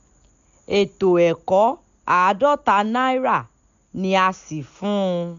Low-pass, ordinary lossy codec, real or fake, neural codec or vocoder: 7.2 kHz; none; real; none